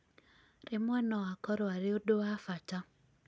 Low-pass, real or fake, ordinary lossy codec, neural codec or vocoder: none; real; none; none